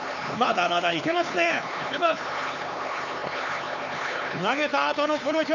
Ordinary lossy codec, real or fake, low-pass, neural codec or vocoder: none; fake; 7.2 kHz; codec, 16 kHz, 4 kbps, X-Codec, HuBERT features, trained on LibriSpeech